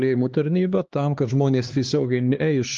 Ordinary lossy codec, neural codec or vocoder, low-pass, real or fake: Opus, 32 kbps; codec, 16 kHz, 2 kbps, X-Codec, HuBERT features, trained on LibriSpeech; 7.2 kHz; fake